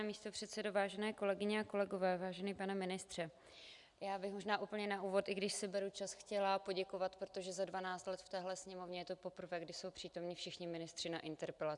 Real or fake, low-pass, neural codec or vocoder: real; 10.8 kHz; none